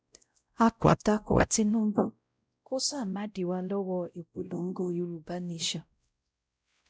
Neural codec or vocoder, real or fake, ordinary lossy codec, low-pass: codec, 16 kHz, 0.5 kbps, X-Codec, WavLM features, trained on Multilingual LibriSpeech; fake; none; none